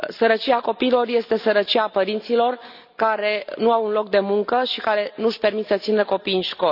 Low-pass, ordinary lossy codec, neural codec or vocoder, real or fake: 5.4 kHz; none; none; real